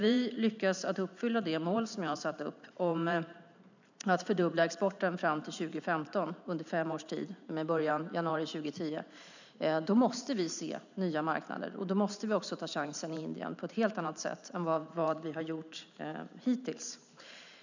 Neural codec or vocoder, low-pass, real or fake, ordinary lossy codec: vocoder, 44.1 kHz, 128 mel bands every 512 samples, BigVGAN v2; 7.2 kHz; fake; none